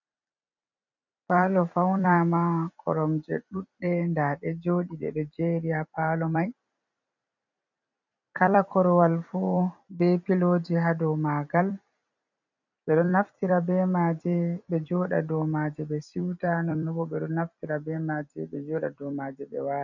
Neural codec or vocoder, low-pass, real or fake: vocoder, 44.1 kHz, 128 mel bands every 256 samples, BigVGAN v2; 7.2 kHz; fake